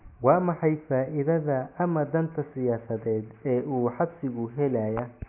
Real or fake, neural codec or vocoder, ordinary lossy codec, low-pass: real; none; none; 3.6 kHz